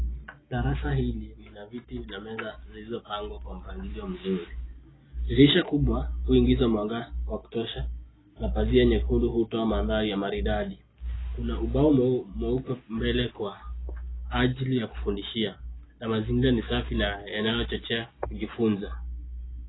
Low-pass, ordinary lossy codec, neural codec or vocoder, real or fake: 7.2 kHz; AAC, 16 kbps; none; real